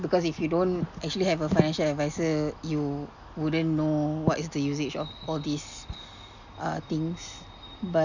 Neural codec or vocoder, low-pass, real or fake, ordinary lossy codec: none; 7.2 kHz; real; none